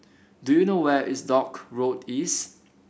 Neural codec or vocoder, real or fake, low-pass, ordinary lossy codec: none; real; none; none